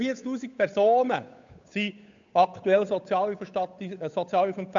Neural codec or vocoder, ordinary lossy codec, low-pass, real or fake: codec, 16 kHz, 8 kbps, FunCodec, trained on Chinese and English, 25 frames a second; MP3, 64 kbps; 7.2 kHz; fake